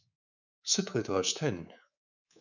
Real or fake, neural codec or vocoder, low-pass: fake; codec, 24 kHz, 3.1 kbps, DualCodec; 7.2 kHz